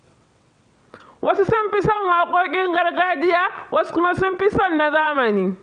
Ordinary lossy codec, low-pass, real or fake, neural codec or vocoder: MP3, 96 kbps; 9.9 kHz; fake; vocoder, 22.05 kHz, 80 mel bands, WaveNeXt